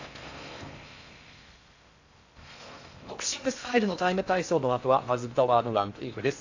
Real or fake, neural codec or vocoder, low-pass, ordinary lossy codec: fake; codec, 16 kHz in and 24 kHz out, 0.6 kbps, FocalCodec, streaming, 4096 codes; 7.2 kHz; AAC, 48 kbps